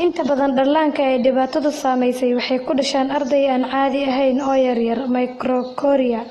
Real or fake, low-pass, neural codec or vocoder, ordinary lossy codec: real; 19.8 kHz; none; AAC, 32 kbps